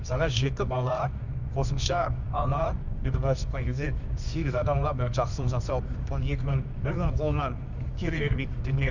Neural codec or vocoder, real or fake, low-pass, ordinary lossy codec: codec, 24 kHz, 0.9 kbps, WavTokenizer, medium music audio release; fake; 7.2 kHz; none